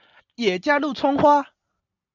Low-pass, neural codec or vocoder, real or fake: 7.2 kHz; none; real